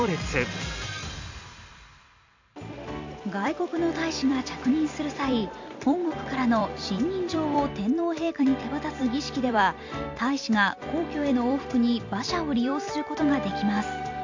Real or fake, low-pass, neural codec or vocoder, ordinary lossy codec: fake; 7.2 kHz; vocoder, 44.1 kHz, 128 mel bands every 256 samples, BigVGAN v2; AAC, 48 kbps